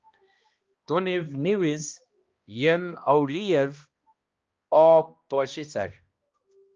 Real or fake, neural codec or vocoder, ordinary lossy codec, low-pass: fake; codec, 16 kHz, 1 kbps, X-Codec, HuBERT features, trained on balanced general audio; Opus, 24 kbps; 7.2 kHz